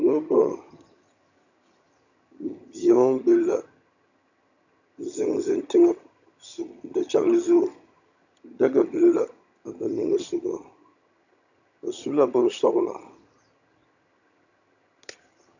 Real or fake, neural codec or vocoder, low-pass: fake; vocoder, 22.05 kHz, 80 mel bands, HiFi-GAN; 7.2 kHz